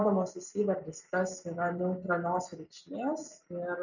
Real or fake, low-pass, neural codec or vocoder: real; 7.2 kHz; none